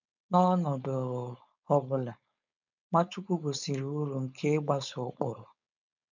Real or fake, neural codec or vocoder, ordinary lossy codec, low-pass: fake; codec, 16 kHz, 4.8 kbps, FACodec; none; 7.2 kHz